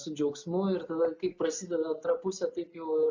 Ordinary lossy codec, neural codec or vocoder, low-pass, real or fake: MP3, 64 kbps; none; 7.2 kHz; real